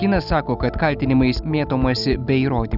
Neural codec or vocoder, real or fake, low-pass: none; real; 5.4 kHz